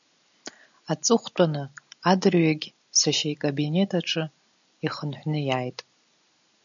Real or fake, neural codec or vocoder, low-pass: real; none; 7.2 kHz